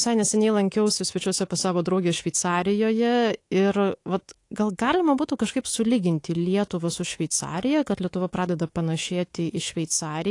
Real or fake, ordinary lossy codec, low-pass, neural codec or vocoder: fake; AAC, 48 kbps; 10.8 kHz; codec, 24 kHz, 3.1 kbps, DualCodec